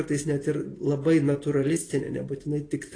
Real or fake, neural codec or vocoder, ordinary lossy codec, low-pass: real; none; AAC, 32 kbps; 9.9 kHz